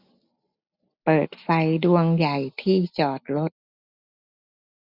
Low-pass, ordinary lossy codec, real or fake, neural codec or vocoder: 5.4 kHz; none; real; none